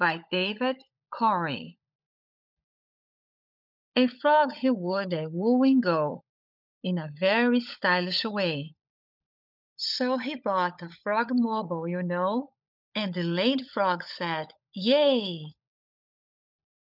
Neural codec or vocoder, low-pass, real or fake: codec, 16 kHz, 16 kbps, FunCodec, trained on LibriTTS, 50 frames a second; 5.4 kHz; fake